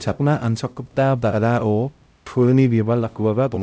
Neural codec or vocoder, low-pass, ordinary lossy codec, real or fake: codec, 16 kHz, 0.5 kbps, X-Codec, HuBERT features, trained on LibriSpeech; none; none; fake